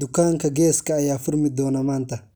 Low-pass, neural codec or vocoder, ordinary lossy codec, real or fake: none; none; none; real